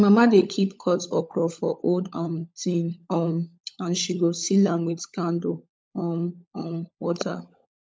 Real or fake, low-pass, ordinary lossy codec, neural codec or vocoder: fake; none; none; codec, 16 kHz, 16 kbps, FunCodec, trained on LibriTTS, 50 frames a second